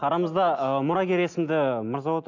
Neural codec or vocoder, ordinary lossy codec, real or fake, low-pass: none; none; real; 7.2 kHz